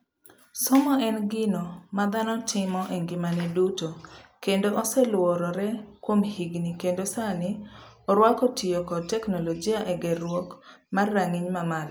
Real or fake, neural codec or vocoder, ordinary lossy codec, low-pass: real; none; none; none